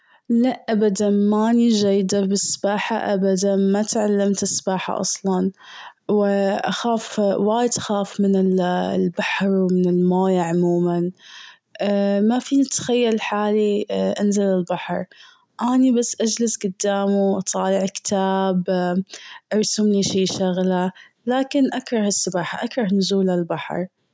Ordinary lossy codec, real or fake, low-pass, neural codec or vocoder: none; real; none; none